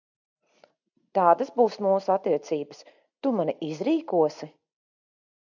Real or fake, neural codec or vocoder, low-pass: real; none; 7.2 kHz